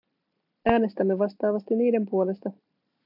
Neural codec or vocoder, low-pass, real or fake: none; 5.4 kHz; real